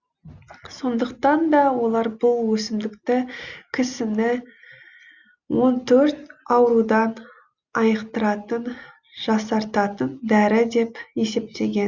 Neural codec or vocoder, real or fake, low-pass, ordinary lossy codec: none; real; 7.2 kHz; Opus, 64 kbps